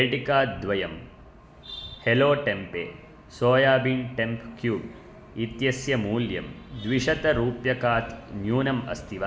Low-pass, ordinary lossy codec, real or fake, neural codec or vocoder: none; none; real; none